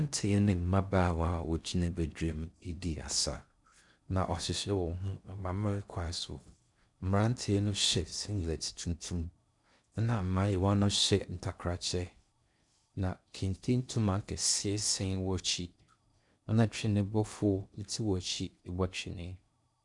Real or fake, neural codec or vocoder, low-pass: fake; codec, 16 kHz in and 24 kHz out, 0.6 kbps, FocalCodec, streaming, 4096 codes; 10.8 kHz